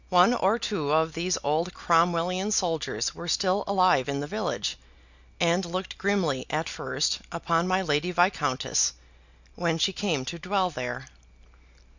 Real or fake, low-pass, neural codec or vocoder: real; 7.2 kHz; none